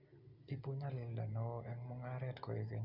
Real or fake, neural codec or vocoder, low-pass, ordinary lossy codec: real; none; 5.4 kHz; Opus, 64 kbps